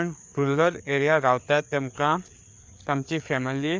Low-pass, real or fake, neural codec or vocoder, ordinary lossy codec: none; fake; codec, 16 kHz, 4 kbps, FreqCodec, larger model; none